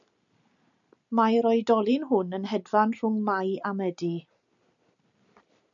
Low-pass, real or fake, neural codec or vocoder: 7.2 kHz; real; none